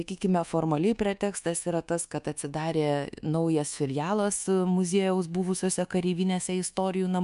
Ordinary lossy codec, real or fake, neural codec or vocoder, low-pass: MP3, 96 kbps; fake; codec, 24 kHz, 1.2 kbps, DualCodec; 10.8 kHz